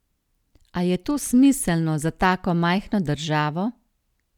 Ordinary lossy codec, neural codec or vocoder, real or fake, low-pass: none; none; real; 19.8 kHz